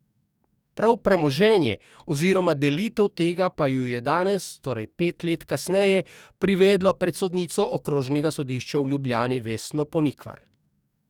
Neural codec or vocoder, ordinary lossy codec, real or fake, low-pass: codec, 44.1 kHz, 2.6 kbps, DAC; none; fake; 19.8 kHz